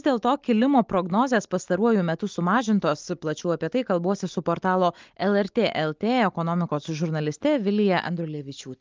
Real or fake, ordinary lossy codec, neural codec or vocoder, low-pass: real; Opus, 24 kbps; none; 7.2 kHz